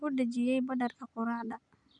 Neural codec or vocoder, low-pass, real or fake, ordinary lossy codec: none; 9.9 kHz; real; none